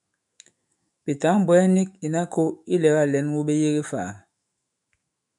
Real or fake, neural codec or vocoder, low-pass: fake; autoencoder, 48 kHz, 128 numbers a frame, DAC-VAE, trained on Japanese speech; 10.8 kHz